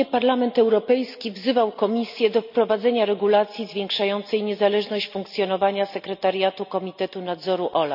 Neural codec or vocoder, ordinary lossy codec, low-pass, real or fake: none; none; 5.4 kHz; real